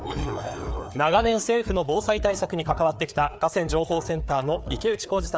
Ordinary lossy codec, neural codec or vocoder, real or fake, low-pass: none; codec, 16 kHz, 4 kbps, FreqCodec, larger model; fake; none